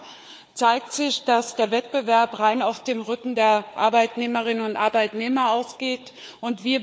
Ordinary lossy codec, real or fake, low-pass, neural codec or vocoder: none; fake; none; codec, 16 kHz, 4 kbps, FunCodec, trained on Chinese and English, 50 frames a second